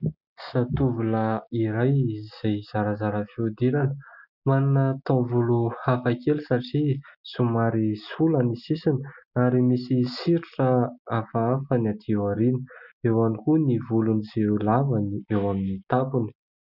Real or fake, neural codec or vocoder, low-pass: real; none; 5.4 kHz